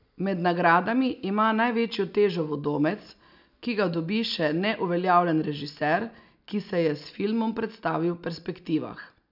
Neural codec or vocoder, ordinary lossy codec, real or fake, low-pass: none; none; real; 5.4 kHz